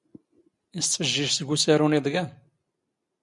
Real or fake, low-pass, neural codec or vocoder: real; 10.8 kHz; none